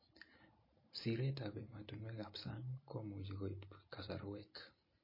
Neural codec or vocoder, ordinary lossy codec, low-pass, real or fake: none; MP3, 24 kbps; 5.4 kHz; real